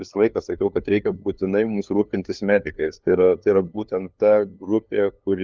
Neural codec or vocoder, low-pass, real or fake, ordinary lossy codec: codec, 16 kHz, 2 kbps, FunCodec, trained on LibriTTS, 25 frames a second; 7.2 kHz; fake; Opus, 32 kbps